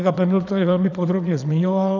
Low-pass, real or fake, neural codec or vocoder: 7.2 kHz; fake; vocoder, 22.05 kHz, 80 mel bands, Vocos